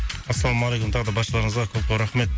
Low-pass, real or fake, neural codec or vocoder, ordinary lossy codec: none; real; none; none